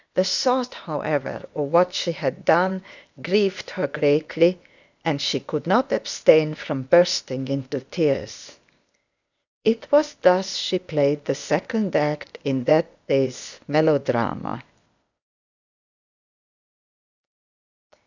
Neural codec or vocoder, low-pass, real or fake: codec, 16 kHz, 0.8 kbps, ZipCodec; 7.2 kHz; fake